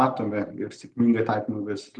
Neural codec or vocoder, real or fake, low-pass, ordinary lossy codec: none; real; 10.8 kHz; Opus, 32 kbps